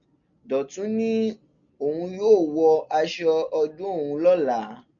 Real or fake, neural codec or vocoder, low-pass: real; none; 7.2 kHz